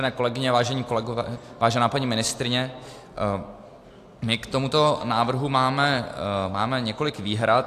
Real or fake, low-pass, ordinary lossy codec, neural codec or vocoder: real; 14.4 kHz; AAC, 64 kbps; none